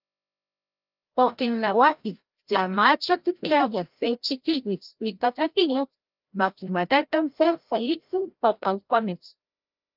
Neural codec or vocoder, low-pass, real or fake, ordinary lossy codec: codec, 16 kHz, 0.5 kbps, FreqCodec, larger model; 5.4 kHz; fake; Opus, 24 kbps